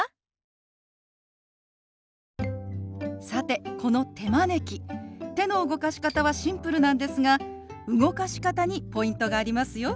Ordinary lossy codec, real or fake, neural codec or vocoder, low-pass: none; real; none; none